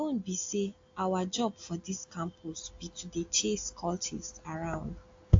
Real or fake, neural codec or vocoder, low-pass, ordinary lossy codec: real; none; 7.2 kHz; AAC, 48 kbps